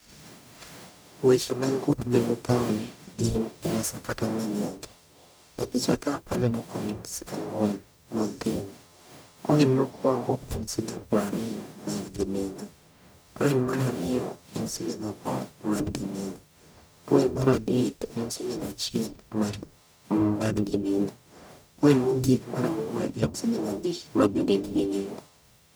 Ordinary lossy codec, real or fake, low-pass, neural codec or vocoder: none; fake; none; codec, 44.1 kHz, 0.9 kbps, DAC